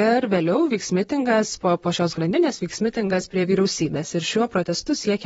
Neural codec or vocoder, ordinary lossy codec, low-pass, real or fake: vocoder, 44.1 kHz, 128 mel bands, Pupu-Vocoder; AAC, 24 kbps; 19.8 kHz; fake